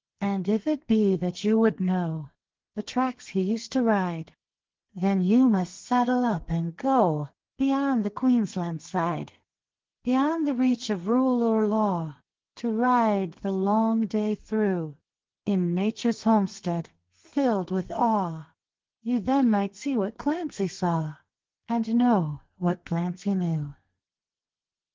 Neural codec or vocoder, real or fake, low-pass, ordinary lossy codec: codec, 32 kHz, 1.9 kbps, SNAC; fake; 7.2 kHz; Opus, 16 kbps